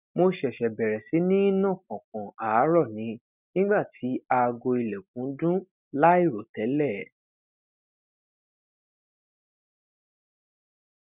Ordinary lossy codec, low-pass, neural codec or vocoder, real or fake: none; 3.6 kHz; none; real